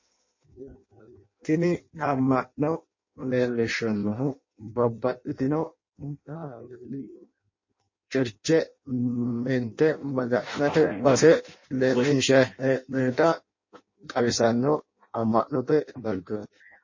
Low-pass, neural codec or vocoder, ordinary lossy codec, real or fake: 7.2 kHz; codec, 16 kHz in and 24 kHz out, 0.6 kbps, FireRedTTS-2 codec; MP3, 32 kbps; fake